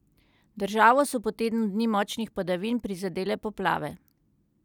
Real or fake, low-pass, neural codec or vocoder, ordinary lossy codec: real; 19.8 kHz; none; none